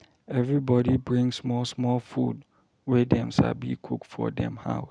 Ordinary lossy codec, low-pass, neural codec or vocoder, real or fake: none; 9.9 kHz; none; real